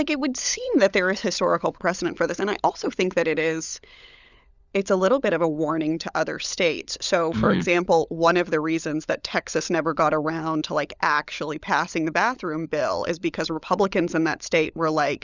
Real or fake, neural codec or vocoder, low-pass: fake; codec, 16 kHz, 16 kbps, FunCodec, trained on LibriTTS, 50 frames a second; 7.2 kHz